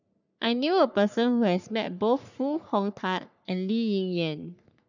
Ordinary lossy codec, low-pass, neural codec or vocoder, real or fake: none; 7.2 kHz; codec, 44.1 kHz, 3.4 kbps, Pupu-Codec; fake